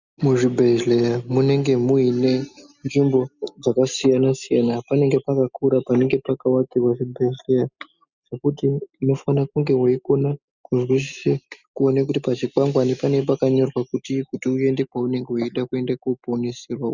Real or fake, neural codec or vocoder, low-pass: real; none; 7.2 kHz